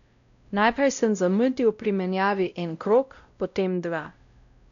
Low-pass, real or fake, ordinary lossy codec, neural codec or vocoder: 7.2 kHz; fake; none; codec, 16 kHz, 0.5 kbps, X-Codec, WavLM features, trained on Multilingual LibriSpeech